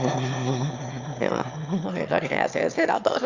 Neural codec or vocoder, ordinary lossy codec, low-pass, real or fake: autoencoder, 22.05 kHz, a latent of 192 numbers a frame, VITS, trained on one speaker; Opus, 64 kbps; 7.2 kHz; fake